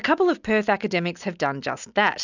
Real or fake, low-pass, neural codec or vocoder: real; 7.2 kHz; none